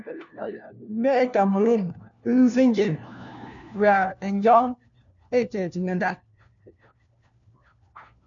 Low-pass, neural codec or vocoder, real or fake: 7.2 kHz; codec, 16 kHz, 1 kbps, FunCodec, trained on LibriTTS, 50 frames a second; fake